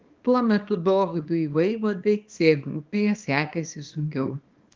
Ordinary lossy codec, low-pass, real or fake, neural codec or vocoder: Opus, 32 kbps; 7.2 kHz; fake; codec, 24 kHz, 0.9 kbps, WavTokenizer, small release